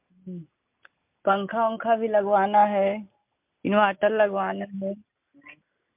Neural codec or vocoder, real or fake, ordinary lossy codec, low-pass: none; real; MP3, 32 kbps; 3.6 kHz